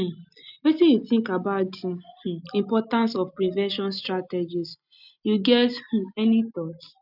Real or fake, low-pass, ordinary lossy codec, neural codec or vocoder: real; 5.4 kHz; none; none